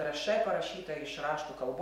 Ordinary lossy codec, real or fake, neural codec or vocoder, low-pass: MP3, 64 kbps; real; none; 19.8 kHz